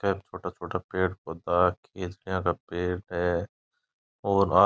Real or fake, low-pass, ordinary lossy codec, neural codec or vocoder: real; none; none; none